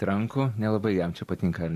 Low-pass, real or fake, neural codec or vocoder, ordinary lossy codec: 14.4 kHz; real; none; AAC, 64 kbps